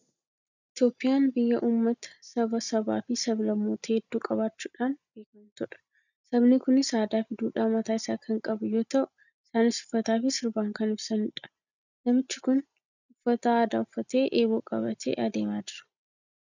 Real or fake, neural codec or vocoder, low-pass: real; none; 7.2 kHz